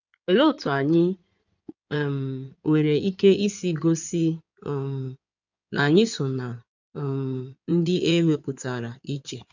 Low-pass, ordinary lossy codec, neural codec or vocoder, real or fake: 7.2 kHz; none; codec, 16 kHz in and 24 kHz out, 2.2 kbps, FireRedTTS-2 codec; fake